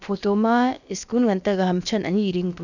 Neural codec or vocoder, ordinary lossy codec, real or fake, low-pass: codec, 16 kHz, about 1 kbps, DyCAST, with the encoder's durations; none; fake; 7.2 kHz